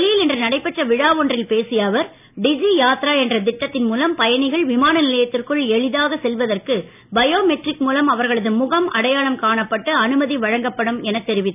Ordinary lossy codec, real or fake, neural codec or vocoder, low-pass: none; real; none; 3.6 kHz